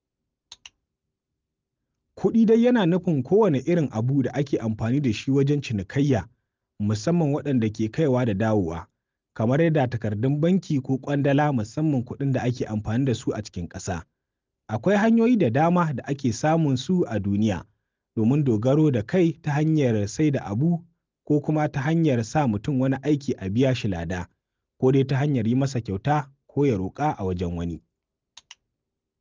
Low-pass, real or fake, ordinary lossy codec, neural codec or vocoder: 7.2 kHz; real; Opus, 32 kbps; none